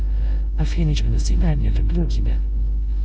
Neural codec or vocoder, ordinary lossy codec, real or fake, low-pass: codec, 16 kHz, 2 kbps, X-Codec, WavLM features, trained on Multilingual LibriSpeech; none; fake; none